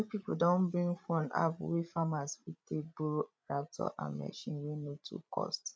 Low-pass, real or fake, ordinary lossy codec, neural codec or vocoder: none; fake; none; codec, 16 kHz, 16 kbps, FreqCodec, larger model